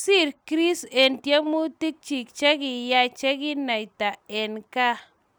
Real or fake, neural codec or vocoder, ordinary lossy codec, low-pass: real; none; none; none